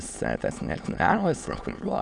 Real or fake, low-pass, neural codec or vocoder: fake; 9.9 kHz; autoencoder, 22.05 kHz, a latent of 192 numbers a frame, VITS, trained on many speakers